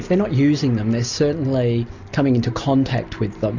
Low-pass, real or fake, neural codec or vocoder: 7.2 kHz; real; none